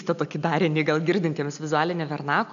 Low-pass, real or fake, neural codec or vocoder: 7.2 kHz; real; none